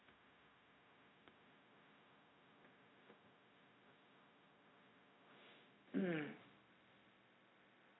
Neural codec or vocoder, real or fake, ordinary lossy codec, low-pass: codec, 16 kHz, 0.4 kbps, LongCat-Audio-Codec; fake; AAC, 16 kbps; 7.2 kHz